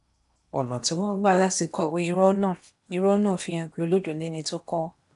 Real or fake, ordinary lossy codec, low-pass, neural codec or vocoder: fake; none; 10.8 kHz; codec, 16 kHz in and 24 kHz out, 0.8 kbps, FocalCodec, streaming, 65536 codes